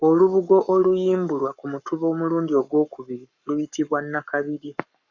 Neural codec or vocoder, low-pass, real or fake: codec, 44.1 kHz, 7.8 kbps, Pupu-Codec; 7.2 kHz; fake